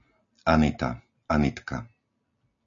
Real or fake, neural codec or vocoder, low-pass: real; none; 7.2 kHz